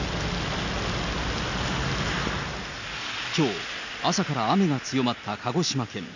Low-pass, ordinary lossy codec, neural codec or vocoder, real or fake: 7.2 kHz; none; none; real